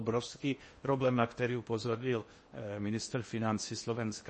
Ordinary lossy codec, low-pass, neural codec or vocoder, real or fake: MP3, 32 kbps; 10.8 kHz; codec, 16 kHz in and 24 kHz out, 0.8 kbps, FocalCodec, streaming, 65536 codes; fake